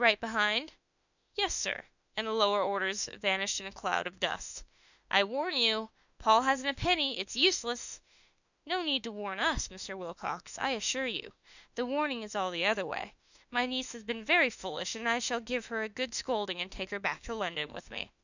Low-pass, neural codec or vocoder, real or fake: 7.2 kHz; autoencoder, 48 kHz, 32 numbers a frame, DAC-VAE, trained on Japanese speech; fake